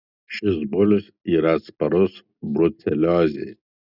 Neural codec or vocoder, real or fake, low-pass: none; real; 5.4 kHz